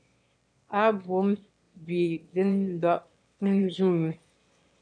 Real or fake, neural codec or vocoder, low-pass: fake; autoencoder, 22.05 kHz, a latent of 192 numbers a frame, VITS, trained on one speaker; 9.9 kHz